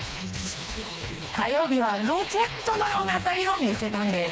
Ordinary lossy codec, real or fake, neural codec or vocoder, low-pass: none; fake; codec, 16 kHz, 2 kbps, FreqCodec, smaller model; none